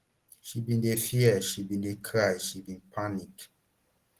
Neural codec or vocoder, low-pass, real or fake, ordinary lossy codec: none; 14.4 kHz; real; Opus, 16 kbps